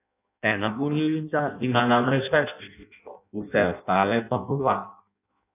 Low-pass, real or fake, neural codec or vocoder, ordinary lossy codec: 3.6 kHz; fake; codec, 16 kHz in and 24 kHz out, 0.6 kbps, FireRedTTS-2 codec; none